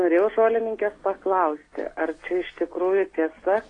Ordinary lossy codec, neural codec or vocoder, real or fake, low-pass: AAC, 32 kbps; none; real; 9.9 kHz